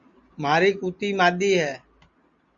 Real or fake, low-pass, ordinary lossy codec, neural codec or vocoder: real; 7.2 kHz; Opus, 64 kbps; none